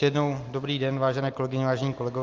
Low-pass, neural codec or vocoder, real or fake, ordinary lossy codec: 7.2 kHz; none; real; Opus, 24 kbps